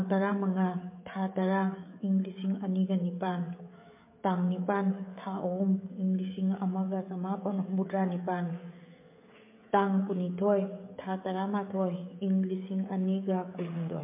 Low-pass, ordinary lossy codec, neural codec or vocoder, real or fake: 3.6 kHz; MP3, 24 kbps; codec, 16 kHz, 16 kbps, FreqCodec, smaller model; fake